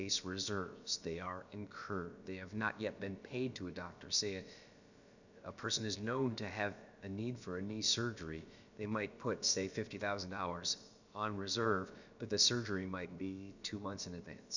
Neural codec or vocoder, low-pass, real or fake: codec, 16 kHz, about 1 kbps, DyCAST, with the encoder's durations; 7.2 kHz; fake